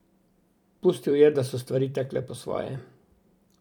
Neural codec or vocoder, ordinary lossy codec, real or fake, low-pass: vocoder, 44.1 kHz, 128 mel bands every 256 samples, BigVGAN v2; none; fake; 19.8 kHz